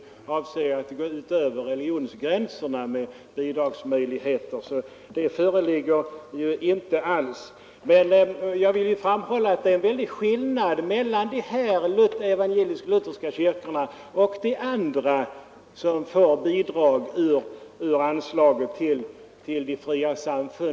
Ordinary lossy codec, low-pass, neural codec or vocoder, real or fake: none; none; none; real